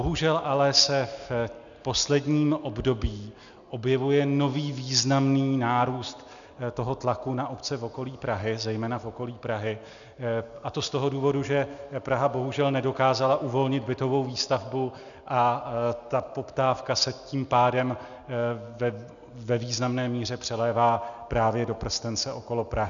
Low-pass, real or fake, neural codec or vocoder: 7.2 kHz; real; none